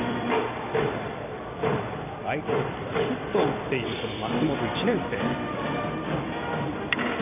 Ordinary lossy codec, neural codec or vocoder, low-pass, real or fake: none; vocoder, 44.1 kHz, 128 mel bands every 256 samples, BigVGAN v2; 3.6 kHz; fake